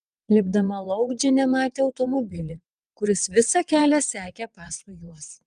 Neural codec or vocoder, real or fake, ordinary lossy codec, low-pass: vocoder, 22.05 kHz, 80 mel bands, WaveNeXt; fake; Opus, 32 kbps; 9.9 kHz